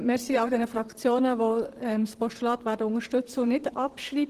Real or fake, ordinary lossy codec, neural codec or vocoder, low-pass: fake; Opus, 16 kbps; vocoder, 44.1 kHz, 128 mel bands, Pupu-Vocoder; 14.4 kHz